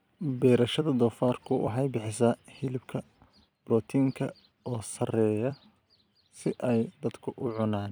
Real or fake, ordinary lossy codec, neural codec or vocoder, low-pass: fake; none; vocoder, 44.1 kHz, 128 mel bands every 256 samples, BigVGAN v2; none